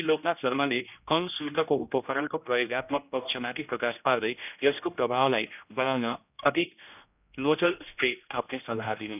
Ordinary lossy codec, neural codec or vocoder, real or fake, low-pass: none; codec, 16 kHz, 1 kbps, X-Codec, HuBERT features, trained on general audio; fake; 3.6 kHz